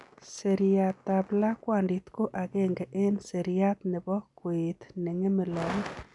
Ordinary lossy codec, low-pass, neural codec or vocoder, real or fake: none; 10.8 kHz; none; real